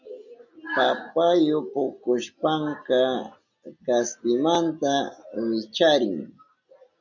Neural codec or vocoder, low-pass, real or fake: none; 7.2 kHz; real